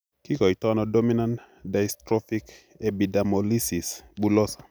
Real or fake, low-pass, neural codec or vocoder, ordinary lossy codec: real; none; none; none